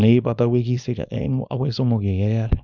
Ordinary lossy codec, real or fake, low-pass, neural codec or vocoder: none; fake; 7.2 kHz; codec, 24 kHz, 0.9 kbps, WavTokenizer, small release